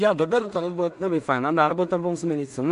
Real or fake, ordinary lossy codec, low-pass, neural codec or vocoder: fake; AAC, 96 kbps; 10.8 kHz; codec, 16 kHz in and 24 kHz out, 0.4 kbps, LongCat-Audio-Codec, two codebook decoder